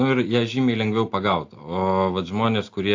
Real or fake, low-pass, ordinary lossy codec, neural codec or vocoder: real; 7.2 kHz; AAC, 48 kbps; none